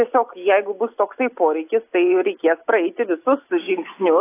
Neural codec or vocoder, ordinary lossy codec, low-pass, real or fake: none; AAC, 24 kbps; 3.6 kHz; real